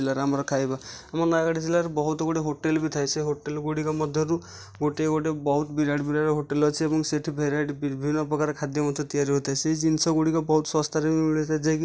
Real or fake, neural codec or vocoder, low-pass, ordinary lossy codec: real; none; none; none